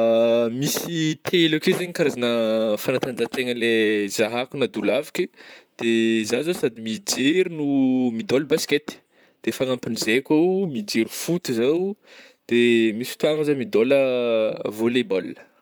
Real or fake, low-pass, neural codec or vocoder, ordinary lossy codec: fake; none; vocoder, 44.1 kHz, 128 mel bands, Pupu-Vocoder; none